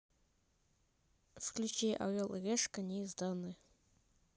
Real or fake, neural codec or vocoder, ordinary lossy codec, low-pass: real; none; none; none